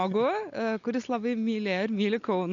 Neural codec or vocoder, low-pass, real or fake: none; 7.2 kHz; real